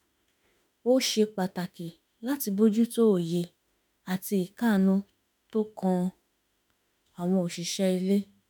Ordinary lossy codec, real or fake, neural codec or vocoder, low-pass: none; fake; autoencoder, 48 kHz, 32 numbers a frame, DAC-VAE, trained on Japanese speech; none